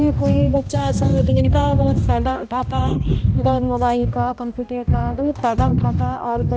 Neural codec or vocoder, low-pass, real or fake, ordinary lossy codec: codec, 16 kHz, 1 kbps, X-Codec, HuBERT features, trained on balanced general audio; none; fake; none